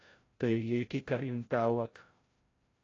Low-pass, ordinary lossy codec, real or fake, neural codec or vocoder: 7.2 kHz; AAC, 32 kbps; fake; codec, 16 kHz, 0.5 kbps, FreqCodec, larger model